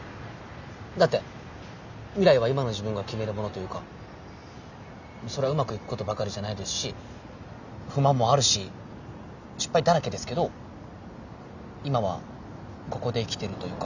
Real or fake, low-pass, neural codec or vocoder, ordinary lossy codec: real; 7.2 kHz; none; none